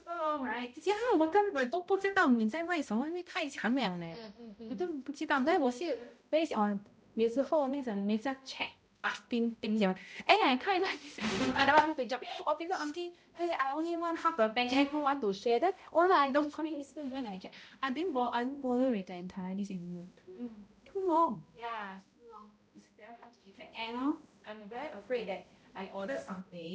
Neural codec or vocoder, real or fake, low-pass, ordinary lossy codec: codec, 16 kHz, 0.5 kbps, X-Codec, HuBERT features, trained on balanced general audio; fake; none; none